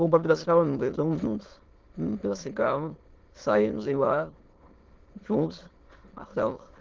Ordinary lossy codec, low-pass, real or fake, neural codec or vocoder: Opus, 16 kbps; 7.2 kHz; fake; autoencoder, 22.05 kHz, a latent of 192 numbers a frame, VITS, trained on many speakers